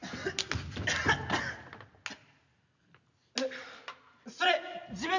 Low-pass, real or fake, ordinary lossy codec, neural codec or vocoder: 7.2 kHz; real; none; none